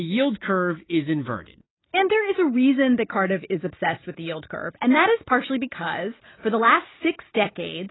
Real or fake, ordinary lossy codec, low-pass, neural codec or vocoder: real; AAC, 16 kbps; 7.2 kHz; none